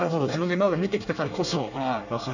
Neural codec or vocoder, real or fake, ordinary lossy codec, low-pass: codec, 24 kHz, 1 kbps, SNAC; fake; none; 7.2 kHz